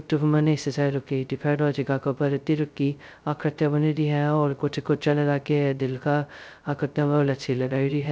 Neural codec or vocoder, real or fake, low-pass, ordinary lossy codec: codec, 16 kHz, 0.2 kbps, FocalCodec; fake; none; none